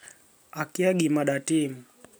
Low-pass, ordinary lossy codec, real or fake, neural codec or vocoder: none; none; real; none